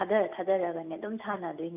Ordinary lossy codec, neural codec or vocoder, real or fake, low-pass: none; none; real; 3.6 kHz